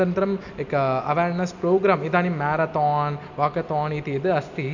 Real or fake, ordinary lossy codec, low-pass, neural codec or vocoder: real; none; 7.2 kHz; none